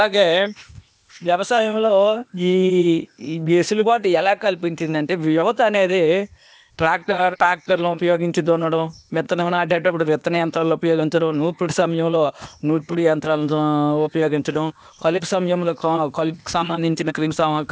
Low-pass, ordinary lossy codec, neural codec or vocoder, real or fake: none; none; codec, 16 kHz, 0.8 kbps, ZipCodec; fake